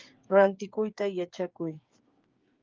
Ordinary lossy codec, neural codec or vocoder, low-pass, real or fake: Opus, 32 kbps; codec, 16 kHz, 8 kbps, FreqCodec, smaller model; 7.2 kHz; fake